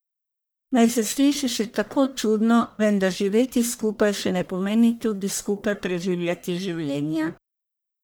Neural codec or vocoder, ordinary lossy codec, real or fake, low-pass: codec, 44.1 kHz, 1.7 kbps, Pupu-Codec; none; fake; none